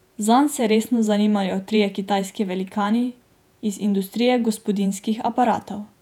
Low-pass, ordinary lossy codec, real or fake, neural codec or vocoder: 19.8 kHz; none; fake; vocoder, 48 kHz, 128 mel bands, Vocos